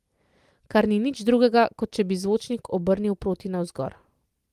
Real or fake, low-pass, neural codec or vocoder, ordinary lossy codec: real; 14.4 kHz; none; Opus, 24 kbps